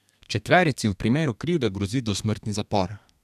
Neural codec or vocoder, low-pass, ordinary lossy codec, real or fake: codec, 32 kHz, 1.9 kbps, SNAC; 14.4 kHz; none; fake